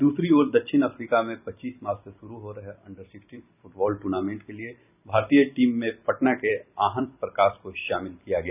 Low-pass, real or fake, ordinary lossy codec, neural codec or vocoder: 3.6 kHz; fake; none; vocoder, 44.1 kHz, 128 mel bands every 256 samples, BigVGAN v2